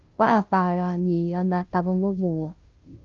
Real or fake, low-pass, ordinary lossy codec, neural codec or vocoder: fake; 7.2 kHz; Opus, 24 kbps; codec, 16 kHz, 0.5 kbps, FunCodec, trained on Chinese and English, 25 frames a second